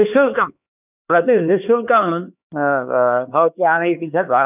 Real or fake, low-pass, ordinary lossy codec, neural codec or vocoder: fake; 3.6 kHz; none; codec, 16 kHz, 2 kbps, X-Codec, HuBERT features, trained on LibriSpeech